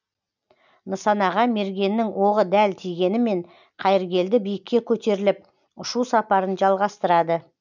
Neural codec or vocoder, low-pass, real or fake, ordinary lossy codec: none; 7.2 kHz; real; none